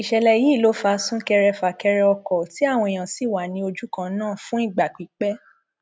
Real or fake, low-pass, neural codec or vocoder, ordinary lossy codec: real; none; none; none